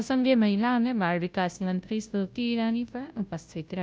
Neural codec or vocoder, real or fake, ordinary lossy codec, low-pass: codec, 16 kHz, 0.5 kbps, FunCodec, trained on Chinese and English, 25 frames a second; fake; none; none